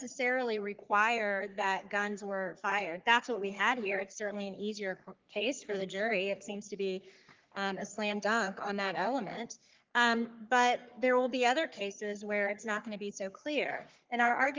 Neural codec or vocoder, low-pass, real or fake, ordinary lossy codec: codec, 44.1 kHz, 3.4 kbps, Pupu-Codec; 7.2 kHz; fake; Opus, 32 kbps